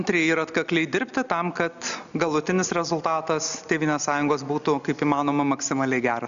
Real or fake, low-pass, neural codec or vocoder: real; 7.2 kHz; none